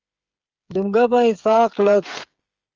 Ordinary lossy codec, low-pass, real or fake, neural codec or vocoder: Opus, 24 kbps; 7.2 kHz; fake; codec, 16 kHz, 8 kbps, FreqCodec, smaller model